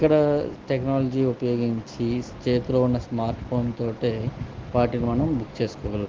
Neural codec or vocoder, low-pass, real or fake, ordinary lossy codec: none; 7.2 kHz; real; Opus, 16 kbps